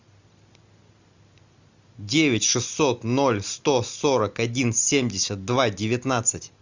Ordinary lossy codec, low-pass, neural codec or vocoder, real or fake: Opus, 64 kbps; 7.2 kHz; none; real